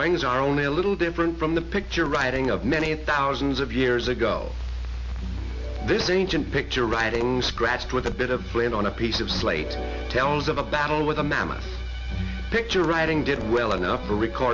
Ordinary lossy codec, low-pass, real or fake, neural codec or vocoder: MP3, 48 kbps; 7.2 kHz; real; none